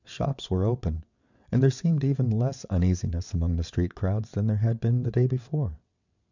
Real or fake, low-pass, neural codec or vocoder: fake; 7.2 kHz; vocoder, 22.05 kHz, 80 mel bands, WaveNeXt